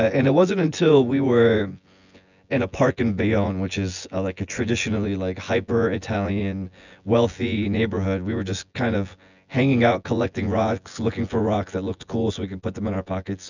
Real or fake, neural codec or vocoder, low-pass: fake; vocoder, 24 kHz, 100 mel bands, Vocos; 7.2 kHz